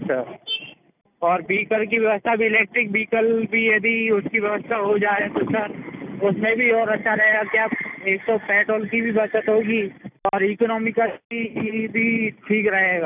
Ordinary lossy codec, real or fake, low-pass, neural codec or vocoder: none; real; 3.6 kHz; none